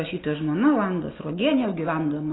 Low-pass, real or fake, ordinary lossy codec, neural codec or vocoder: 7.2 kHz; real; AAC, 16 kbps; none